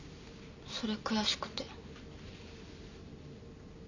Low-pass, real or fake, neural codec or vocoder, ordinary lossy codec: 7.2 kHz; real; none; none